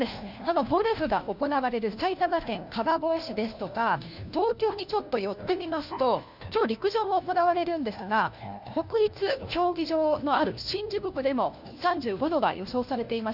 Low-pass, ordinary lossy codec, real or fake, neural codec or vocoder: 5.4 kHz; none; fake; codec, 16 kHz, 1 kbps, FunCodec, trained on LibriTTS, 50 frames a second